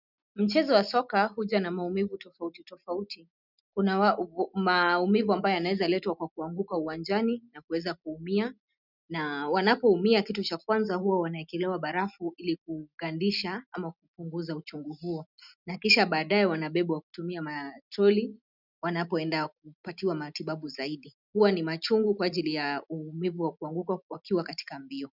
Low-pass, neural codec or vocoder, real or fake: 5.4 kHz; none; real